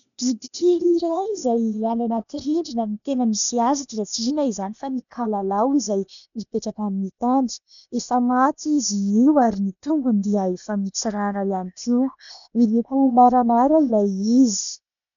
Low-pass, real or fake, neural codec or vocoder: 7.2 kHz; fake; codec, 16 kHz, 0.8 kbps, ZipCodec